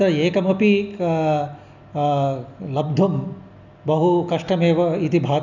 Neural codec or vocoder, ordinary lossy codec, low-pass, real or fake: none; none; 7.2 kHz; real